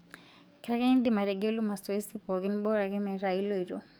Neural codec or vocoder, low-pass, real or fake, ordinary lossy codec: codec, 44.1 kHz, 7.8 kbps, DAC; none; fake; none